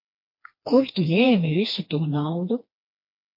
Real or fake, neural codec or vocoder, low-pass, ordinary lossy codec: fake; codec, 16 kHz, 2 kbps, FreqCodec, smaller model; 5.4 kHz; MP3, 32 kbps